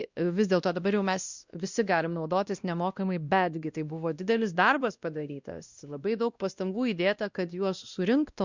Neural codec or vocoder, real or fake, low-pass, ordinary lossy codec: codec, 16 kHz, 1 kbps, X-Codec, WavLM features, trained on Multilingual LibriSpeech; fake; 7.2 kHz; Opus, 64 kbps